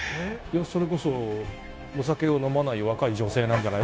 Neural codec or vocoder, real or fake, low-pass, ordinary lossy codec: codec, 16 kHz, 0.9 kbps, LongCat-Audio-Codec; fake; none; none